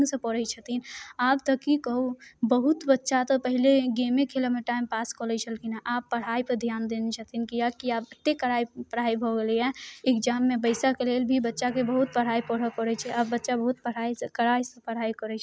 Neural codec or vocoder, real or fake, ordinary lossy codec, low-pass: none; real; none; none